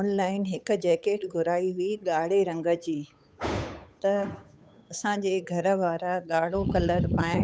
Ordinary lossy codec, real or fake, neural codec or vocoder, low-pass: none; fake; codec, 16 kHz, 8 kbps, FunCodec, trained on Chinese and English, 25 frames a second; none